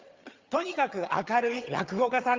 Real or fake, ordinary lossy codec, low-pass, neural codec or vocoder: fake; Opus, 32 kbps; 7.2 kHz; vocoder, 22.05 kHz, 80 mel bands, HiFi-GAN